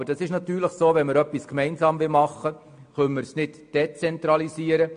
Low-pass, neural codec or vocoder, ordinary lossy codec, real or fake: 9.9 kHz; none; MP3, 48 kbps; real